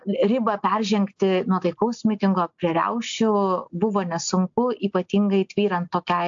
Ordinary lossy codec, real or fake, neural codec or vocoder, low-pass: AAC, 64 kbps; real; none; 7.2 kHz